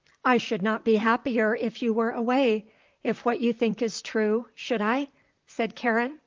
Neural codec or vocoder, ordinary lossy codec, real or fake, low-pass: vocoder, 44.1 kHz, 128 mel bands, Pupu-Vocoder; Opus, 24 kbps; fake; 7.2 kHz